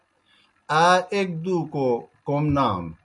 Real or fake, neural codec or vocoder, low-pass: real; none; 10.8 kHz